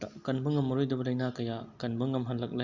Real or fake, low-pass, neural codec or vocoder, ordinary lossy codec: real; 7.2 kHz; none; Opus, 64 kbps